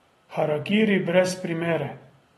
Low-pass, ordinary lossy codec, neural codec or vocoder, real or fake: 19.8 kHz; AAC, 32 kbps; none; real